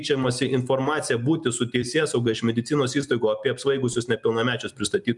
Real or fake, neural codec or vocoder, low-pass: real; none; 10.8 kHz